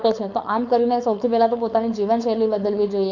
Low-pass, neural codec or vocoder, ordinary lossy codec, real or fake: 7.2 kHz; codec, 16 kHz, 4.8 kbps, FACodec; none; fake